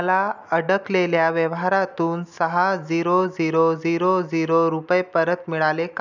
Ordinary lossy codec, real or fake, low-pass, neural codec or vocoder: none; real; 7.2 kHz; none